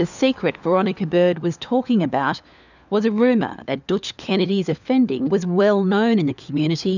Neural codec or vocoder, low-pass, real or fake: codec, 16 kHz, 4 kbps, FunCodec, trained on LibriTTS, 50 frames a second; 7.2 kHz; fake